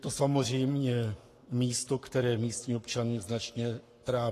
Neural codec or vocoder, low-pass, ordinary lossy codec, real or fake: codec, 44.1 kHz, 7.8 kbps, Pupu-Codec; 14.4 kHz; AAC, 48 kbps; fake